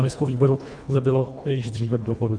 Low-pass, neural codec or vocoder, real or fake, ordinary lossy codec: 9.9 kHz; codec, 24 kHz, 1.5 kbps, HILCodec; fake; MP3, 96 kbps